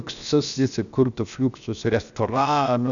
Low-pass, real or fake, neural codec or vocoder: 7.2 kHz; fake; codec, 16 kHz, 0.7 kbps, FocalCodec